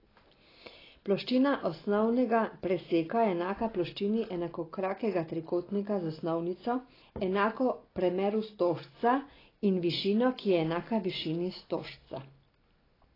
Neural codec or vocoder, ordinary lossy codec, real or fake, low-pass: none; AAC, 24 kbps; real; 5.4 kHz